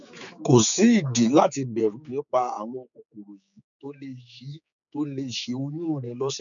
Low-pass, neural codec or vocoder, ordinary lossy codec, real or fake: 7.2 kHz; codec, 16 kHz, 4 kbps, X-Codec, HuBERT features, trained on general audio; none; fake